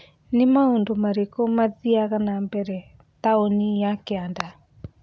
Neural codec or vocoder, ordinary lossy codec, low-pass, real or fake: none; none; none; real